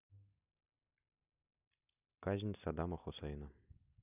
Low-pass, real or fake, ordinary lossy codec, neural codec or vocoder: 3.6 kHz; real; none; none